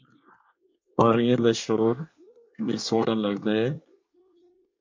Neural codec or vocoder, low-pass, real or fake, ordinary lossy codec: codec, 24 kHz, 1 kbps, SNAC; 7.2 kHz; fake; MP3, 48 kbps